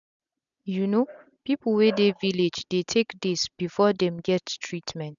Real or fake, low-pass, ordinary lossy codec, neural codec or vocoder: real; 7.2 kHz; none; none